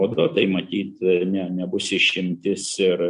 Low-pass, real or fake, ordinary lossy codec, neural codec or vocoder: 10.8 kHz; real; MP3, 64 kbps; none